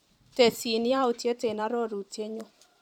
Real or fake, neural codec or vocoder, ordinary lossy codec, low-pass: fake; vocoder, 44.1 kHz, 128 mel bands every 512 samples, BigVGAN v2; none; 19.8 kHz